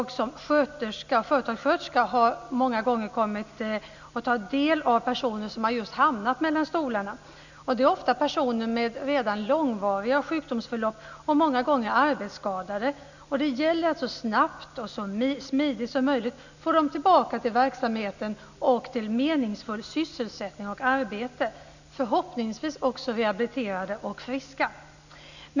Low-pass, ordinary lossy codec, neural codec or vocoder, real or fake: 7.2 kHz; none; none; real